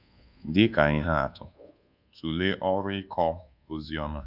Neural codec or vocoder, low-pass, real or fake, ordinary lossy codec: codec, 24 kHz, 1.2 kbps, DualCodec; 5.4 kHz; fake; none